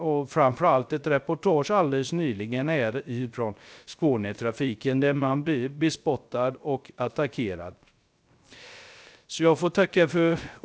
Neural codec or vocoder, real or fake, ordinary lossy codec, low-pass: codec, 16 kHz, 0.3 kbps, FocalCodec; fake; none; none